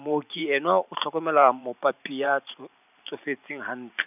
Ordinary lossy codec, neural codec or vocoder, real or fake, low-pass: none; vocoder, 44.1 kHz, 128 mel bands every 512 samples, BigVGAN v2; fake; 3.6 kHz